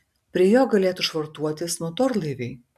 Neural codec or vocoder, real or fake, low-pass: none; real; 14.4 kHz